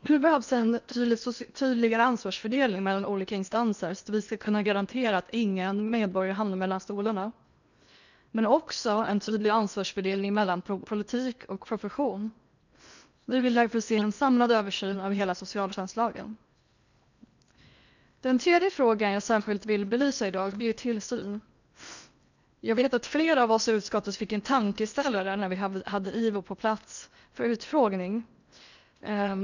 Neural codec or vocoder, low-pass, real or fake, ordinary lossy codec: codec, 16 kHz in and 24 kHz out, 0.8 kbps, FocalCodec, streaming, 65536 codes; 7.2 kHz; fake; none